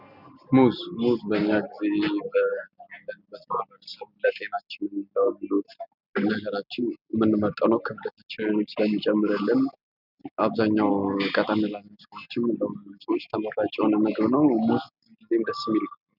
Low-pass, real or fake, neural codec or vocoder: 5.4 kHz; real; none